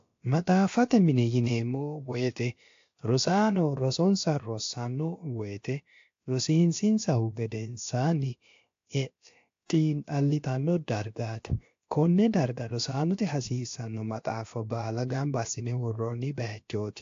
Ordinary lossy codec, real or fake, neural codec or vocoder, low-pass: AAC, 48 kbps; fake; codec, 16 kHz, about 1 kbps, DyCAST, with the encoder's durations; 7.2 kHz